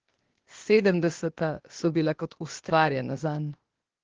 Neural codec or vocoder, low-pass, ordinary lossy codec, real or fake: codec, 16 kHz, 0.8 kbps, ZipCodec; 7.2 kHz; Opus, 16 kbps; fake